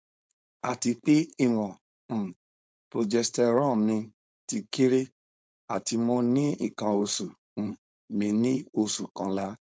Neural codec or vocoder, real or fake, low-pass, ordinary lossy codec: codec, 16 kHz, 4.8 kbps, FACodec; fake; none; none